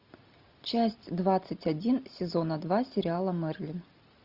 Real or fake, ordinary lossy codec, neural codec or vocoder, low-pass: real; Opus, 64 kbps; none; 5.4 kHz